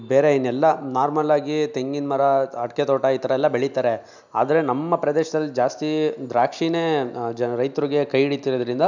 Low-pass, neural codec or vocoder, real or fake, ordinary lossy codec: 7.2 kHz; none; real; none